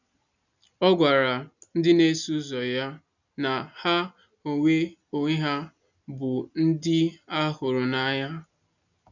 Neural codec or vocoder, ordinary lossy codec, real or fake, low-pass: none; none; real; 7.2 kHz